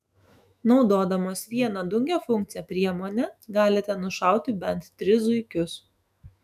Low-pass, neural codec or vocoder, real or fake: 14.4 kHz; autoencoder, 48 kHz, 128 numbers a frame, DAC-VAE, trained on Japanese speech; fake